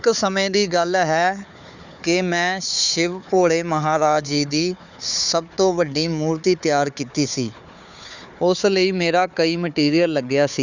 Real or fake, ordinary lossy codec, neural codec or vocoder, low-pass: fake; none; codec, 16 kHz, 4 kbps, FunCodec, trained on Chinese and English, 50 frames a second; 7.2 kHz